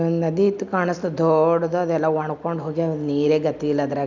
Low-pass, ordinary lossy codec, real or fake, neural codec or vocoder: 7.2 kHz; none; real; none